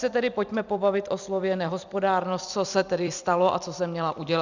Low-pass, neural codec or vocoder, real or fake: 7.2 kHz; vocoder, 44.1 kHz, 128 mel bands every 256 samples, BigVGAN v2; fake